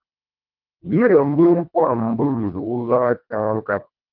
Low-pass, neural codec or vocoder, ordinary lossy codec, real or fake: 5.4 kHz; codec, 24 kHz, 1.5 kbps, HILCodec; Opus, 32 kbps; fake